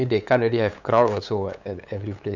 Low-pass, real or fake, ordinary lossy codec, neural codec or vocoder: 7.2 kHz; fake; none; codec, 16 kHz, 8 kbps, FunCodec, trained on LibriTTS, 25 frames a second